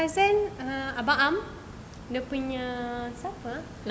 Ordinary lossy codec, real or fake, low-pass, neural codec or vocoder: none; real; none; none